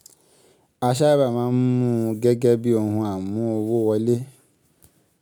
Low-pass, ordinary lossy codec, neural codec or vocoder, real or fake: none; none; none; real